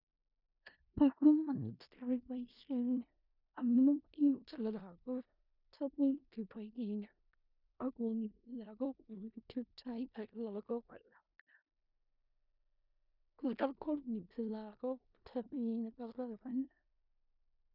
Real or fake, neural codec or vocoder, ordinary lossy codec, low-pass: fake; codec, 16 kHz in and 24 kHz out, 0.4 kbps, LongCat-Audio-Codec, four codebook decoder; none; 5.4 kHz